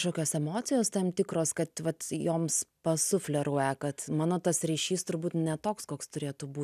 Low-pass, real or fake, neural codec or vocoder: 14.4 kHz; real; none